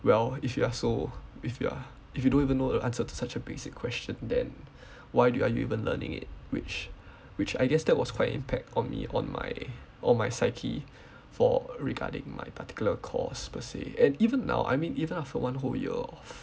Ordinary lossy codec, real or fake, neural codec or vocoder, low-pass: none; real; none; none